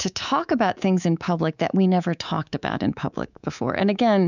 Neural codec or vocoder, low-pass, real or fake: codec, 24 kHz, 3.1 kbps, DualCodec; 7.2 kHz; fake